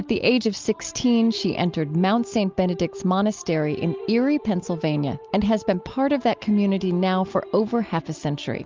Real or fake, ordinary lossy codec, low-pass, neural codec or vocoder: real; Opus, 24 kbps; 7.2 kHz; none